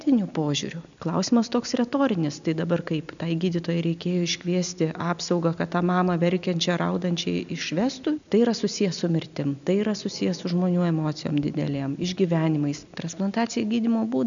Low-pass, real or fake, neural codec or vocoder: 7.2 kHz; real; none